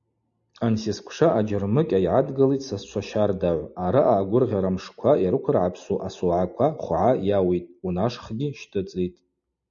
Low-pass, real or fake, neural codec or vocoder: 7.2 kHz; real; none